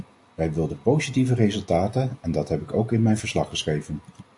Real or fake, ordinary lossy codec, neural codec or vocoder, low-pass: real; AAC, 64 kbps; none; 10.8 kHz